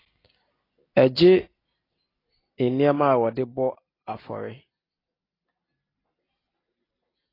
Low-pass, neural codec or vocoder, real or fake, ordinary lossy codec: 5.4 kHz; none; real; AAC, 24 kbps